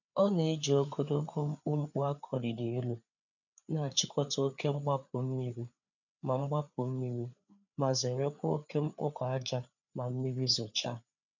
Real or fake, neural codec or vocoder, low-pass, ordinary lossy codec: fake; codec, 16 kHz, 4 kbps, FreqCodec, larger model; 7.2 kHz; none